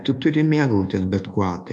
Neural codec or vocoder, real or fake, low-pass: codec, 24 kHz, 1.2 kbps, DualCodec; fake; 10.8 kHz